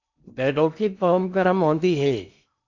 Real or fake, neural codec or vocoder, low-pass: fake; codec, 16 kHz in and 24 kHz out, 0.8 kbps, FocalCodec, streaming, 65536 codes; 7.2 kHz